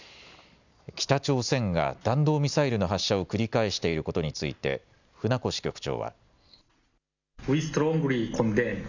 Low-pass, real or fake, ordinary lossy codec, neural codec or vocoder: 7.2 kHz; real; none; none